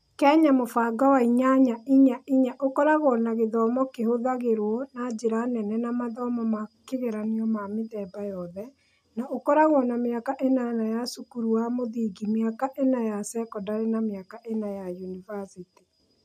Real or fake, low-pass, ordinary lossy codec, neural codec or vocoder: real; 14.4 kHz; none; none